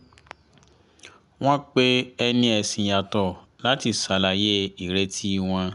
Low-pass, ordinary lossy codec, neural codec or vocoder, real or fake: 10.8 kHz; none; none; real